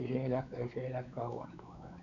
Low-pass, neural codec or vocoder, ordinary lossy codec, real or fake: 7.2 kHz; codec, 16 kHz, 4 kbps, X-Codec, WavLM features, trained on Multilingual LibriSpeech; none; fake